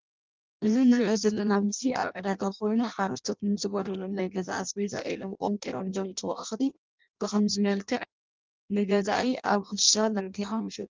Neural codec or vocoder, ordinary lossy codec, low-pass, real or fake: codec, 16 kHz in and 24 kHz out, 0.6 kbps, FireRedTTS-2 codec; Opus, 24 kbps; 7.2 kHz; fake